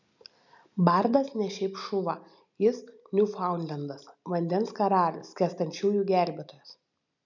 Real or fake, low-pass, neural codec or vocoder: real; 7.2 kHz; none